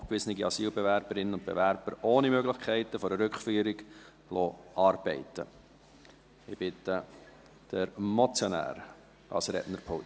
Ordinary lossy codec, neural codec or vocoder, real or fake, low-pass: none; none; real; none